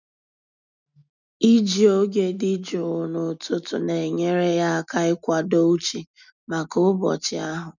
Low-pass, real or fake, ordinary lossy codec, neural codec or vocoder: 7.2 kHz; real; none; none